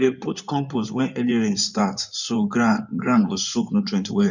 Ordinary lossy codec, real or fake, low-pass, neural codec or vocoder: none; fake; 7.2 kHz; codec, 16 kHz in and 24 kHz out, 2.2 kbps, FireRedTTS-2 codec